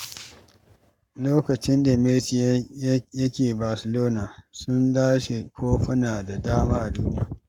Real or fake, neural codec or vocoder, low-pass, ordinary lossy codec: fake; codec, 44.1 kHz, 7.8 kbps, Pupu-Codec; 19.8 kHz; none